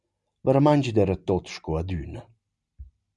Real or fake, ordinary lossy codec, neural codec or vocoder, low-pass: real; MP3, 96 kbps; none; 10.8 kHz